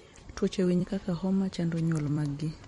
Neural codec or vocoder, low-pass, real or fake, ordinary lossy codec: vocoder, 44.1 kHz, 128 mel bands every 256 samples, BigVGAN v2; 19.8 kHz; fake; MP3, 48 kbps